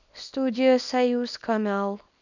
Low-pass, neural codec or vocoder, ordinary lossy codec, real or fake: 7.2 kHz; codec, 24 kHz, 0.9 kbps, WavTokenizer, small release; none; fake